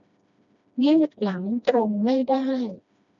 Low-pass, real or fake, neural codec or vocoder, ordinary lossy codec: 7.2 kHz; fake; codec, 16 kHz, 1 kbps, FreqCodec, smaller model; none